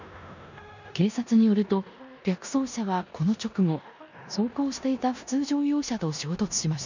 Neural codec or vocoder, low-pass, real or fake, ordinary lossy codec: codec, 16 kHz in and 24 kHz out, 0.9 kbps, LongCat-Audio-Codec, four codebook decoder; 7.2 kHz; fake; none